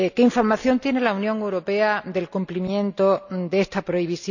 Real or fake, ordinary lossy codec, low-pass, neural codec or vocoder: real; none; 7.2 kHz; none